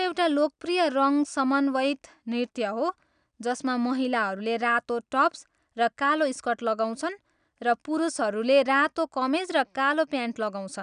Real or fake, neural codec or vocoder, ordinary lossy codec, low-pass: real; none; none; 9.9 kHz